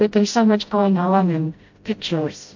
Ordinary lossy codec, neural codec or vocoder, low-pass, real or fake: MP3, 48 kbps; codec, 16 kHz, 0.5 kbps, FreqCodec, smaller model; 7.2 kHz; fake